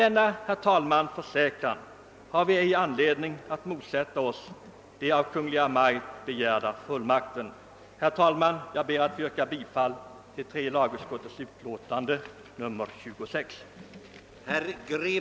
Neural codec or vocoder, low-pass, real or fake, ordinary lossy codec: none; none; real; none